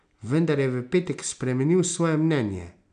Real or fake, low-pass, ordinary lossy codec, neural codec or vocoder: real; 9.9 kHz; none; none